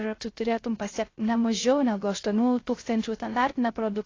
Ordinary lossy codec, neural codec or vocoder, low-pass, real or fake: AAC, 32 kbps; codec, 16 kHz, 0.3 kbps, FocalCodec; 7.2 kHz; fake